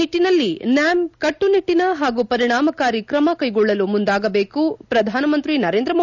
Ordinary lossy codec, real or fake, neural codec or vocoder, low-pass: none; real; none; 7.2 kHz